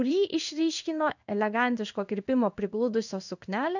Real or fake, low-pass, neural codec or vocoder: fake; 7.2 kHz; codec, 16 kHz in and 24 kHz out, 1 kbps, XY-Tokenizer